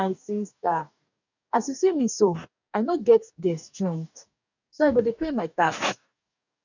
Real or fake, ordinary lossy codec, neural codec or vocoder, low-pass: fake; none; codec, 44.1 kHz, 2.6 kbps, DAC; 7.2 kHz